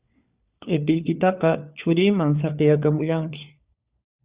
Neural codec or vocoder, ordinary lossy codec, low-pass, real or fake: codec, 16 kHz, 4 kbps, FunCodec, trained on LibriTTS, 50 frames a second; Opus, 64 kbps; 3.6 kHz; fake